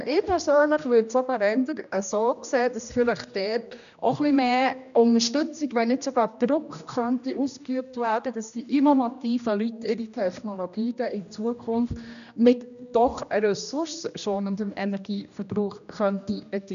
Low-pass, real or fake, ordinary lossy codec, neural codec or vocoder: 7.2 kHz; fake; none; codec, 16 kHz, 1 kbps, X-Codec, HuBERT features, trained on general audio